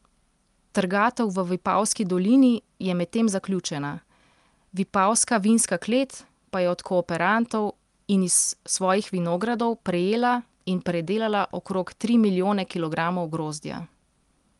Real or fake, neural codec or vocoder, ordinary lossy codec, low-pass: real; none; Opus, 32 kbps; 10.8 kHz